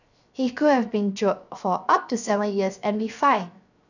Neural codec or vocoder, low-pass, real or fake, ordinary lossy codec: codec, 16 kHz, 0.7 kbps, FocalCodec; 7.2 kHz; fake; none